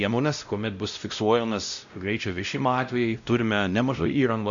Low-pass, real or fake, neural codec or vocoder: 7.2 kHz; fake; codec, 16 kHz, 0.5 kbps, X-Codec, WavLM features, trained on Multilingual LibriSpeech